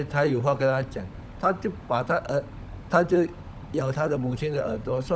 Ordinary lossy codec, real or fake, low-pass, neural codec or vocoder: none; fake; none; codec, 16 kHz, 16 kbps, FunCodec, trained on LibriTTS, 50 frames a second